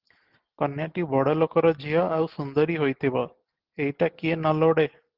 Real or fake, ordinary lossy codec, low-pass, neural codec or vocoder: real; Opus, 16 kbps; 5.4 kHz; none